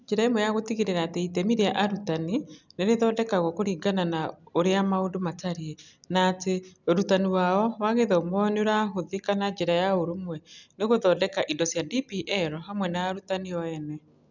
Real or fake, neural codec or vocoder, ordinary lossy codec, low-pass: real; none; none; 7.2 kHz